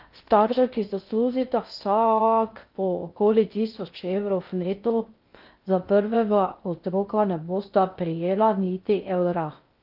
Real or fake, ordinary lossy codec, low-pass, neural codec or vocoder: fake; Opus, 32 kbps; 5.4 kHz; codec, 16 kHz in and 24 kHz out, 0.6 kbps, FocalCodec, streaming, 2048 codes